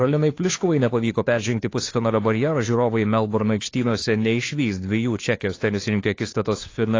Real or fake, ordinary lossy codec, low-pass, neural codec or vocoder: fake; AAC, 32 kbps; 7.2 kHz; codec, 16 kHz, 2 kbps, FunCodec, trained on Chinese and English, 25 frames a second